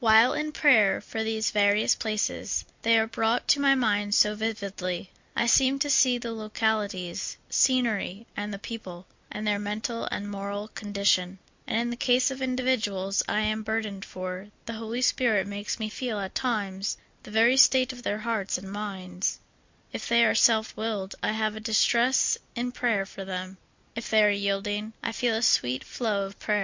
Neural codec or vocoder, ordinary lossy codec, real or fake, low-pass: none; MP3, 48 kbps; real; 7.2 kHz